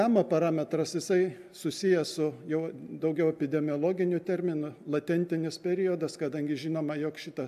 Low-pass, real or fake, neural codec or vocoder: 14.4 kHz; real; none